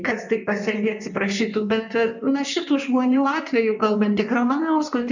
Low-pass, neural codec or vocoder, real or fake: 7.2 kHz; codec, 16 kHz in and 24 kHz out, 1.1 kbps, FireRedTTS-2 codec; fake